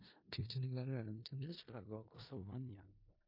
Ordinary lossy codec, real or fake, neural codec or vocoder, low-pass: MP3, 32 kbps; fake; codec, 16 kHz in and 24 kHz out, 0.4 kbps, LongCat-Audio-Codec, four codebook decoder; 5.4 kHz